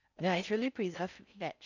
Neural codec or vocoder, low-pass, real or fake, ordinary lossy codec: codec, 16 kHz in and 24 kHz out, 0.6 kbps, FocalCodec, streaming, 4096 codes; 7.2 kHz; fake; none